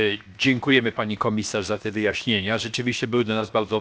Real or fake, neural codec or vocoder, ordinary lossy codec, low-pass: fake; codec, 16 kHz, 0.7 kbps, FocalCodec; none; none